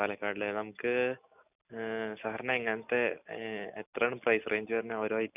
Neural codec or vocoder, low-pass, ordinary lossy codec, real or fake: none; 3.6 kHz; none; real